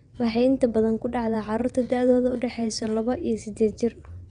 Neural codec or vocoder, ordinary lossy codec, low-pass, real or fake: vocoder, 22.05 kHz, 80 mel bands, WaveNeXt; none; 9.9 kHz; fake